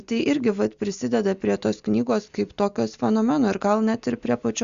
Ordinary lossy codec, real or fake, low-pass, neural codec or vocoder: Opus, 64 kbps; real; 7.2 kHz; none